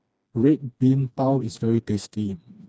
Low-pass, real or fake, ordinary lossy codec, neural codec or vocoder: none; fake; none; codec, 16 kHz, 2 kbps, FreqCodec, smaller model